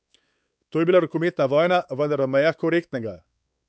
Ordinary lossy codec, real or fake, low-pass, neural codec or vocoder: none; fake; none; codec, 16 kHz, 4 kbps, X-Codec, WavLM features, trained on Multilingual LibriSpeech